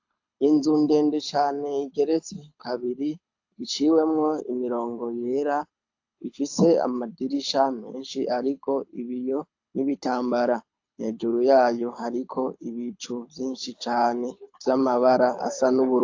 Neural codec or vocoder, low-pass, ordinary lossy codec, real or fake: codec, 24 kHz, 6 kbps, HILCodec; 7.2 kHz; AAC, 48 kbps; fake